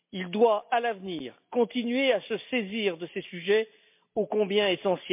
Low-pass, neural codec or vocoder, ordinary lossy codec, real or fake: 3.6 kHz; none; MP3, 32 kbps; real